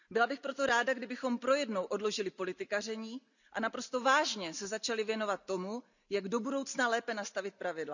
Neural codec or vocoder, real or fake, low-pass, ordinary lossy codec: none; real; 7.2 kHz; none